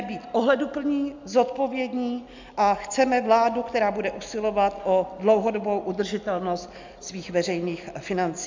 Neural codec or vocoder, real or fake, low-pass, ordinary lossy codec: none; real; 7.2 kHz; MP3, 64 kbps